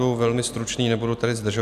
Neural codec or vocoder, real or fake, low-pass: none; real; 14.4 kHz